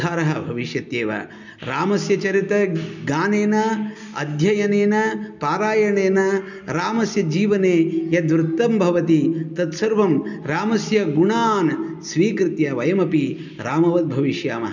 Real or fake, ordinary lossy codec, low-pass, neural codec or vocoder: real; none; 7.2 kHz; none